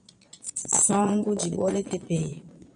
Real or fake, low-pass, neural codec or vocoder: fake; 9.9 kHz; vocoder, 22.05 kHz, 80 mel bands, Vocos